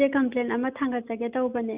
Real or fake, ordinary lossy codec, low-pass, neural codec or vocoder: real; Opus, 64 kbps; 3.6 kHz; none